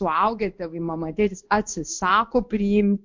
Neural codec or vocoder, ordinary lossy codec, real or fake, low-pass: codec, 16 kHz in and 24 kHz out, 1 kbps, XY-Tokenizer; MP3, 48 kbps; fake; 7.2 kHz